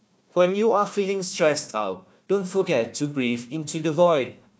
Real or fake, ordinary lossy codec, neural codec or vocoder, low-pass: fake; none; codec, 16 kHz, 1 kbps, FunCodec, trained on Chinese and English, 50 frames a second; none